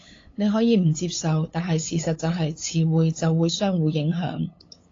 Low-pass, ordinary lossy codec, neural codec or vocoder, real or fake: 7.2 kHz; AAC, 32 kbps; codec, 16 kHz, 8 kbps, FunCodec, trained on LibriTTS, 25 frames a second; fake